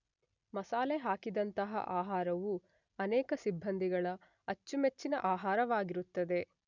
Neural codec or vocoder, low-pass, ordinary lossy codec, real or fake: none; 7.2 kHz; none; real